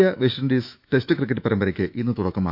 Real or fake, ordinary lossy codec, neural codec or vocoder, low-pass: fake; none; autoencoder, 48 kHz, 128 numbers a frame, DAC-VAE, trained on Japanese speech; 5.4 kHz